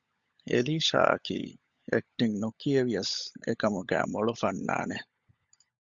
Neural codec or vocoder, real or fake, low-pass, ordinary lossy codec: codec, 16 kHz, 16 kbps, FunCodec, trained on LibriTTS, 50 frames a second; fake; 7.2 kHz; Opus, 64 kbps